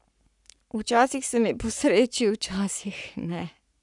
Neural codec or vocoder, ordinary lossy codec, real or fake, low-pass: codec, 44.1 kHz, 7.8 kbps, Pupu-Codec; none; fake; 10.8 kHz